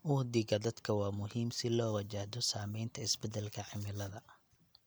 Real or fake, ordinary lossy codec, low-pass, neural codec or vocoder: real; none; none; none